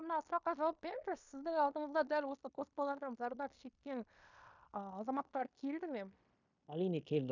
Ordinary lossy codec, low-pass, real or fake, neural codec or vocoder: none; 7.2 kHz; fake; codec, 16 kHz in and 24 kHz out, 0.9 kbps, LongCat-Audio-Codec, fine tuned four codebook decoder